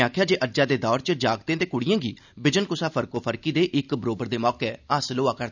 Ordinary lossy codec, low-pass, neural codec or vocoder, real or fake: none; 7.2 kHz; none; real